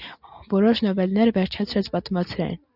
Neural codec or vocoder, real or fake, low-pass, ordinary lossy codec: none; real; 5.4 kHz; Opus, 64 kbps